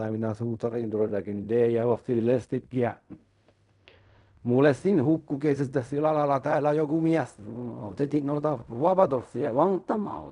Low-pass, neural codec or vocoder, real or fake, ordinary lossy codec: 10.8 kHz; codec, 16 kHz in and 24 kHz out, 0.4 kbps, LongCat-Audio-Codec, fine tuned four codebook decoder; fake; none